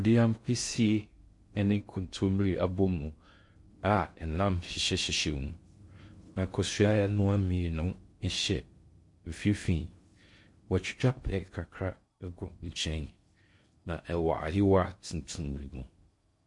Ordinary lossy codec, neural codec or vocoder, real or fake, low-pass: MP3, 48 kbps; codec, 16 kHz in and 24 kHz out, 0.6 kbps, FocalCodec, streaming, 2048 codes; fake; 10.8 kHz